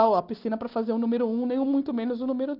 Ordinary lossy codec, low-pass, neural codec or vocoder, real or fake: Opus, 24 kbps; 5.4 kHz; none; real